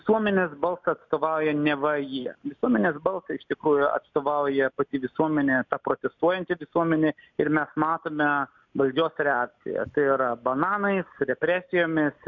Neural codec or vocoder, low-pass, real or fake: none; 7.2 kHz; real